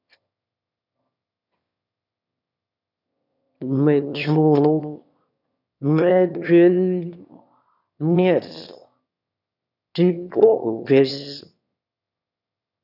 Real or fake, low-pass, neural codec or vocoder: fake; 5.4 kHz; autoencoder, 22.05 kHz, a latent of 192 numbers a frame, VITS, trained on one speaker